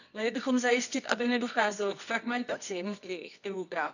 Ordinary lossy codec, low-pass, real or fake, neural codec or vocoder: none; 7.2 kHz; fake; codec, 24 kHz, 0.9 kbps, WavTokenizer, medium music audio release